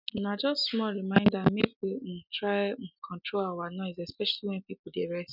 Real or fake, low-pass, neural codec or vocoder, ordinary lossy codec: real; 5.4 kHz; none; none